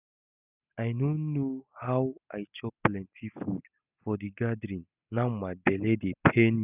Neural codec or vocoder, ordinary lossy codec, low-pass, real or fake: none; none; 3.6 kHz; real